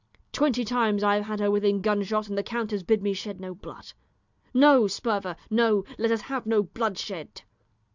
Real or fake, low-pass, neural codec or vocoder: real; 7.2 kHz; none